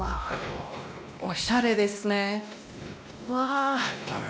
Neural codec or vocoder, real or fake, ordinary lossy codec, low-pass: codec, 16 kHz, 1 kbps, X-Codec, WavLM features, trained on Multilingual LibriSpeech; fake; none; none